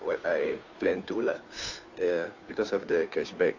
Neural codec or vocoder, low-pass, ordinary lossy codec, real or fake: codec, 16 kHz, 2 kbps, FunCodec, trained on Chinese and English, 25 frames a second; 7.2 kHz; AAC, 48 kbps; fake